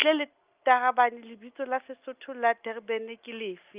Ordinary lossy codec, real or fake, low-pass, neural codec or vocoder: Opus, 24 kbps; real; 3.6 kHz; none